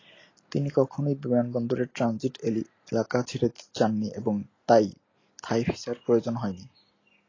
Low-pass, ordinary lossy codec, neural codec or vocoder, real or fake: 7.2 kHz; AAC, 32 kbps; none; real